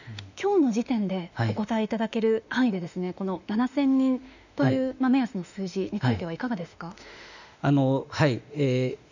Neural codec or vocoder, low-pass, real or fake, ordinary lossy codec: autoencoder, 48 kHz, 32 numbers a frame, DAC-VAE, trained on Japanese speech; 7.2 kHz; fake; none